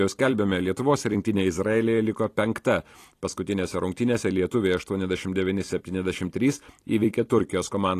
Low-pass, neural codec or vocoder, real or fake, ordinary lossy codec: 14.4 kHz; vocoder, 44.1 kHz, 128 mel bands every 256 samples, BigVGAN v2; fake; AAC, 48 kbps